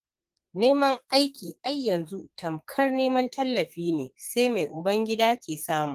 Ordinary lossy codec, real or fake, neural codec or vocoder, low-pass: Opus, 24 kbps; fake; codec, 32 kHz, 1.9 kbps, SNAC; 14.4 kHz